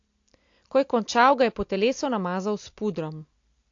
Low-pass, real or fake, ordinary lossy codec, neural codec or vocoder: 7.2 kHz; real; AAC, 48 kbps; none